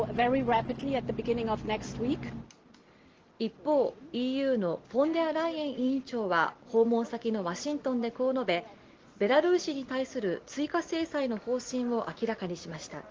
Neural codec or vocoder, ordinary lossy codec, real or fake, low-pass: none; Opus, 16 kbps; real; 7.2 kHz